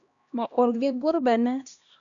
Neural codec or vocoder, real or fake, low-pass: codec, 16 kHz, 1 kbps, X-Codec, HuBERT features, trained on LibriSpeech; fake; 7.2 kHz